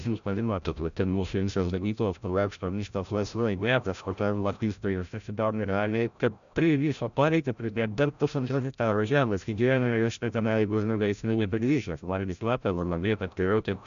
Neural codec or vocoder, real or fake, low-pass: codec, 16 kHz, 0.5 kbps, FreqCodec, larger model; fake; 7.2 kHz